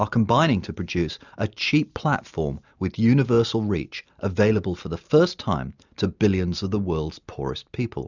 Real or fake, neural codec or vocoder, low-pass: real; none; 7.2 kHz